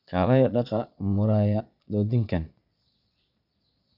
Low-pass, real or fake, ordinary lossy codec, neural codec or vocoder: 5.4 kHz; fake; none; vocoder, 22.05 kHz, 80 mel bands, Vocos